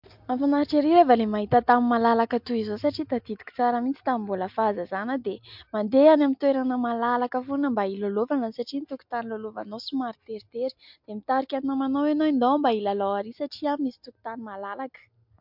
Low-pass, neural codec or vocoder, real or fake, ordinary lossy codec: 5.4 kHz; none; real; MP3, 48 kbps